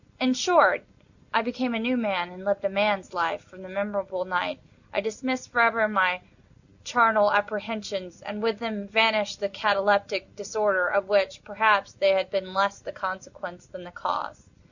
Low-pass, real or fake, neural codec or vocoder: 7.2 kHz; real; none